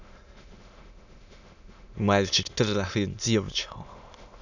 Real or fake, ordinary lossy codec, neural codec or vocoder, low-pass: fake; none; autoencoder, 22.05 kHz, a latent of 192 numbers a frame, VITS, trained on many speakers; 7.2 kHz